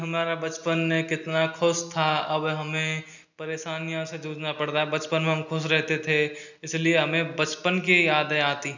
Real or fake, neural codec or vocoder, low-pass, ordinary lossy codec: real; none; 7.2 kHz; none